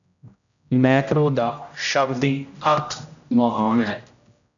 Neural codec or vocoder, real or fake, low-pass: codec, 16 kHz, 0.5 kbps, X-Codec, HuBERT features, trained on general audio; fake; 7.2 kHz